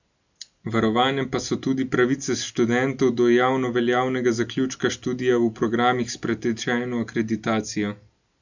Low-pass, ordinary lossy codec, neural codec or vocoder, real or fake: 7.2 kHz; none; none; real